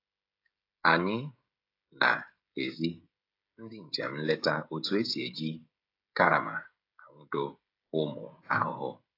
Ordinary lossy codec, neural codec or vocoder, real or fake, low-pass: AAC, 32 kbps; codec, 16 kHz, 16 kbps, FreqCodec, smaller model; fake; 5.4 kHz